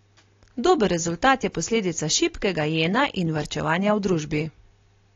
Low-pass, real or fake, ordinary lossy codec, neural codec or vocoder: 7.2 kHz; real; AAC, 32 kbps; none